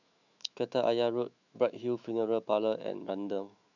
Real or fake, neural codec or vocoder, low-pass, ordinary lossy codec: fake; autoencoder, 48 kHz, 128 numbers a frame, DAC-VAE, trained on Japanese speech; 7.2 kHz; none